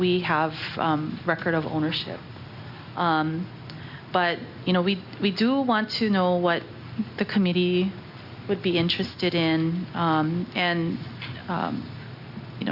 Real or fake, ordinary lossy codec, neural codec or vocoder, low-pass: real; Opus, 64 kbps; none; 5.4 kHz